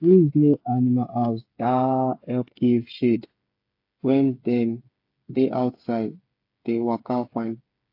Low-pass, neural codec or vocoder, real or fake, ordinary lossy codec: 5.4 kHz; codec, 16 kHz, 8 kbps, FreqCodec, smaller model; fake; AAC, 32 kbps